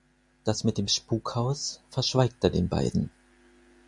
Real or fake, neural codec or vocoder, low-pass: real; none; 10.8 kHz